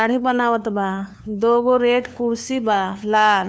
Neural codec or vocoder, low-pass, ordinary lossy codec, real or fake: codec, 16 kHz, 2 kbps, FunCodec, trained on LibriTTS, 25 frames a second; none; none; fake